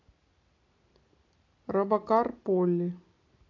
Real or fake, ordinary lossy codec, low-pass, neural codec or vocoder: real; AAC, 32 kbps; 7.2 kHz; none